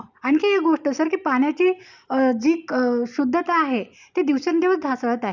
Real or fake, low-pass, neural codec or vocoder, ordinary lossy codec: real; 7.2 kHz; none; none